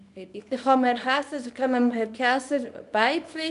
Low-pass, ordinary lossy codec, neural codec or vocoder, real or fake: 10.8 kHz; none; codec, 24 kHz, 0.9 kbps, WavTokenizer, small release; fake